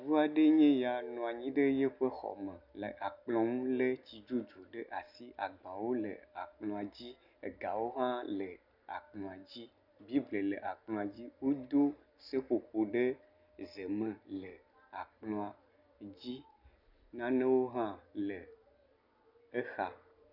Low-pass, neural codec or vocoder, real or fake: 5.4 kHz; none; real